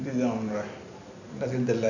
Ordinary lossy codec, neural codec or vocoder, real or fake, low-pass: none; none; real; 7.2 kHz